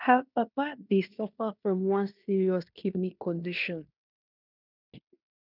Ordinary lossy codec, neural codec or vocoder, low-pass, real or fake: none; codec, 16 kHz in and 24 kHz out, 0.9 kbps, LongCat-Audio-Codec, fine tuned four codebook decoder; 5.4 kHz; fake